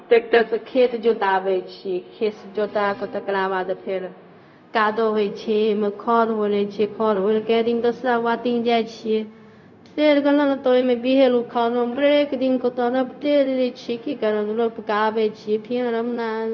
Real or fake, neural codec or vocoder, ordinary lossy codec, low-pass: fake; codec, 16 kHz, 0.4 kbps, LongCat-Audio-Codec; none; 7.2 kHz